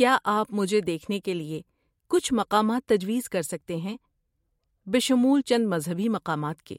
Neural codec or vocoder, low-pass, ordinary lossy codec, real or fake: vocoder, 44.1 kHz, 128 mel bands every 512 samples, BigVGAN v2; 19.8 kHz; MP3, 64 kbps; fake